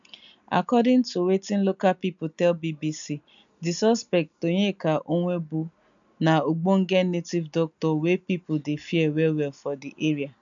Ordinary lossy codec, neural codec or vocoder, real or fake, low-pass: none; none; real; 7.2 kHz